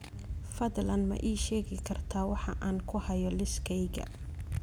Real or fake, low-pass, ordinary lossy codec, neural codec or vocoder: real; none; none; none